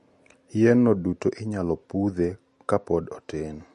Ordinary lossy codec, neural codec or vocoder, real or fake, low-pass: MP3, 48 kbps; none; real; 14.4 kHz